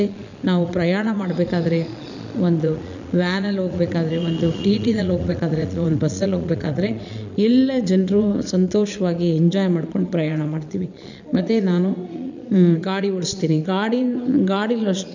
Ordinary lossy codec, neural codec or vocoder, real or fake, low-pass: none; vocoder, 22.05 kHz, 80 mel bands, Vocos; fake; 7.2 kHz